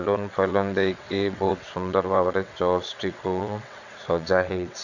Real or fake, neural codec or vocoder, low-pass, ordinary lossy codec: fake; vocoder, 22.05 kHz, 80 mel bands, WaveNeXt; 7.2 kHz; none